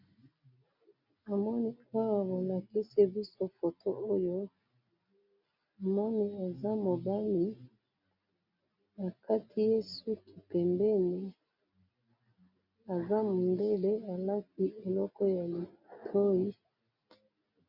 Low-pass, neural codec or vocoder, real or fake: 5.4 kHz; none; real